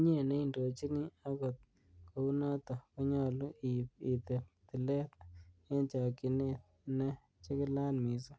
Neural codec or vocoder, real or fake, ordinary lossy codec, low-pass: none; real; none; none